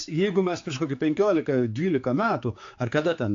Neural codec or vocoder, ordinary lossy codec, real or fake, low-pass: codec, 16 kHz, 4 kbps, X-Codec, HuBERT features, trained on general audio; AAC, 48 kbps; fake; 7.2 kHz